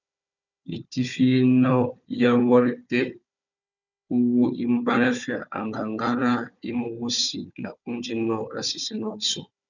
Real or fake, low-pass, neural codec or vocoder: fake; 7.2 kHz; codec, 16 kHz, 4 kbps, FunCodec, trained on Chinese and English, 50 frames a second